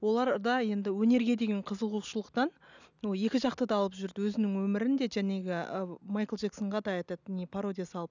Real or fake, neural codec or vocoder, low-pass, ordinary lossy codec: real; none; 7.2 kHz; none